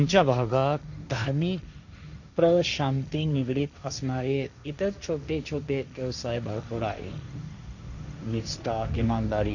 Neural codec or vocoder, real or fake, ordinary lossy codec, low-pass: codec, 16 kHz, 1.1 kbps, Voila-Tokenizer; fake; none; 7.2 kHz